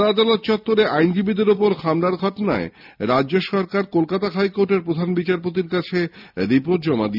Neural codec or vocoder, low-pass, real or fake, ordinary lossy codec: none; 5.4 kHz; real; none